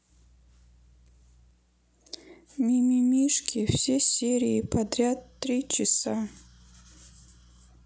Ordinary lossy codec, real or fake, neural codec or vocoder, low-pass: none; real; none; none